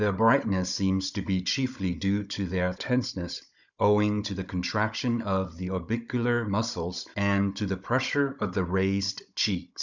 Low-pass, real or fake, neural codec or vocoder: 7.2 kHz; fake; codec, 16 kHz, 16 kbps, FunCodec, trained on Chinese and English, 50 frames a second